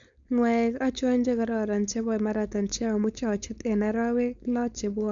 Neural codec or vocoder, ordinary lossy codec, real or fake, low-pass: codec, 16 kHz, 4.8 kbps, FACodec; none; fake; 7.2 kHz